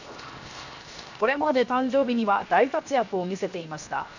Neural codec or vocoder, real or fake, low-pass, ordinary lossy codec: codec, 16 kHz, 0.7 kbps, FocalCodec; fake; 7.2 kHz; none